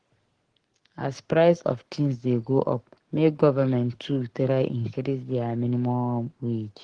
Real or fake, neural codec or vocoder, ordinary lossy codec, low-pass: fake; codec, 44.1 kHz, 7.8 kbps, Pupu-Codec; Opus, 16 kbps; 9.9 kHz